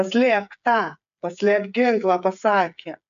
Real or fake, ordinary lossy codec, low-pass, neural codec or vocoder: fake; AAC, 96 kbps; 7.2 kHz; codec, 16 kHz, 8 kbps, FreqCodec, smaller model